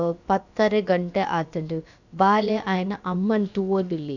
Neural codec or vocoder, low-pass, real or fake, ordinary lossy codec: codec, 16 kHz, about 1 kbps, DyCAST, with the encoder's durations; 7.2 kHz; fake; none